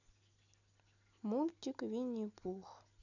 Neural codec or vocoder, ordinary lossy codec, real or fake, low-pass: none; none; real; 7.2 kHz